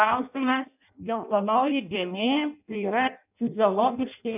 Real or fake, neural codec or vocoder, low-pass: fake; codec, 16 kHz in and 24 kHz out, 0.6 kbps, FireRedTTS-2 codec; 3.6 kHz